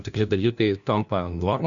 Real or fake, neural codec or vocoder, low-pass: fake; codec, 16 kHz, 1 kbps, FunCodec, trained on LibriTTS, 50 frames a second; 7.2 kHz